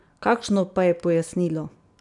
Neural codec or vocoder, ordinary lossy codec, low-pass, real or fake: none; none; 10.8 kHz; real